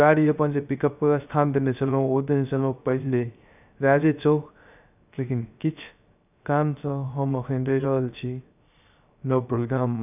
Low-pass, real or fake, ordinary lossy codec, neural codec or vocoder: 3.6 kHz; fake; none; codec, 16 kHz, 0.3 kbps, FocalCodec